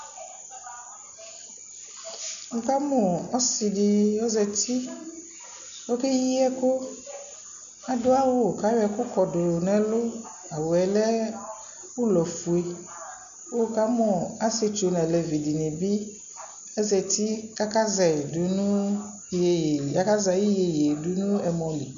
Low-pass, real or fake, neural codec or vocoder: 7.2 kHz; real; none